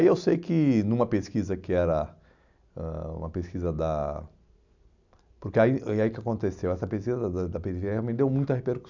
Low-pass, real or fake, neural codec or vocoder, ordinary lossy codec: 7.2 kHz; real; none; none